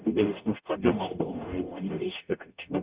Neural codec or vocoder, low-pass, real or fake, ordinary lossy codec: codec, 44.1 kHz, 0.9 kbps, DAC; 3.6 kHz; fake; Opus, 64 kbps